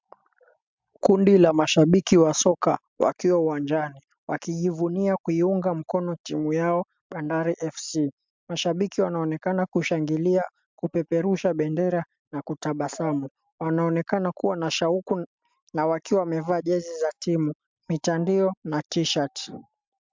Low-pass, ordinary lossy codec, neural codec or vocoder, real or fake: 7.2 kHz; MP3, 64 kbps; none; real